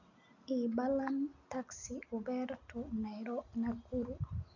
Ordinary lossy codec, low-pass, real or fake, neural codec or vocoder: none; 7.2 kHz; real; none